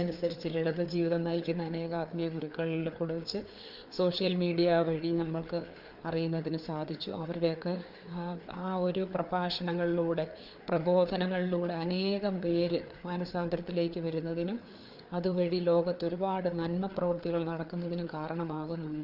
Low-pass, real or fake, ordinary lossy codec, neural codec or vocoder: 5.4 kHz; fake; none; codec, 16 kHz, 4 kbps, FreqCodec, larger model